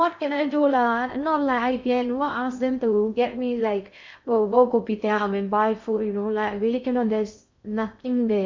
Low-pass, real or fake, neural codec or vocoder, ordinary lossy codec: 7.2 kHz; fake; codec, 16 kHz in and 24 kHz out, 0.6 kbps, FocalCodec, streaming, 2048 codes; MP3, 64 kbps